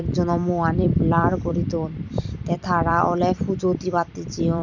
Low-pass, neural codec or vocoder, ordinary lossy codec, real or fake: 7.2 kHz; vocoder, 44.1 kHz, 128 mel bands every 256 samples, BigVGAN v2; none; fake